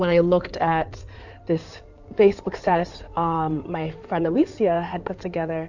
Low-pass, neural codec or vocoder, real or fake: 7.2 kHz; codec, 16 kHz in and 24 kHz out, 2.2 kbps, FireRedTTS-2 codec; fake